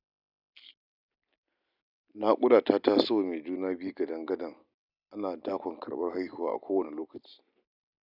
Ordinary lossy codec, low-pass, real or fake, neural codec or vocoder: none; 5.4 kHz; real; none